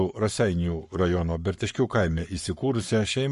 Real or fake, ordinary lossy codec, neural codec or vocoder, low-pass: fake; MP3, 48 kbps; codec, 44.1 kHz, 7.8 kbps, Pupu-Codec; 14.4 kHz